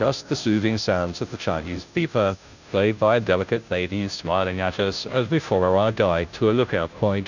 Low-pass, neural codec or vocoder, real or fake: 7.2 kHz; codec, 16 kHz, 0.5 kbps, FunCodec, trained on Chinese and English, 25 frames a second; fake